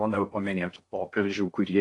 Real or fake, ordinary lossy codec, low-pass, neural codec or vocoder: fake; AAC, 48 kbps; 10.8 kHz; codec, 16 kHz in and 24 kHz out, 0.6 kbps, FocalCodec, streaming, 4096 codes